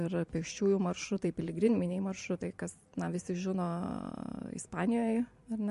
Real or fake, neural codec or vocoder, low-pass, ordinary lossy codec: fake; vocoder, 44.1 kHz, 128 mel bands every 512 samples, BigVGAN v2; 14.4 kHz; MP3, 48 kbps